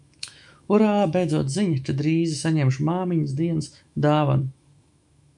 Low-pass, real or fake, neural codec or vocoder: 10.8 kHz; fake; autoencoder, 48 kHz, 128 numbers a frame, DAC-VAE, trained on Japanese speech